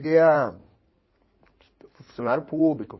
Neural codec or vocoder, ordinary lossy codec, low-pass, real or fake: codec, 16 kHz in and 24 kHz out, 2.2 kbps, FireRedTTS-2 codec; MP3, 24 kbps; 7.2 kHz; fake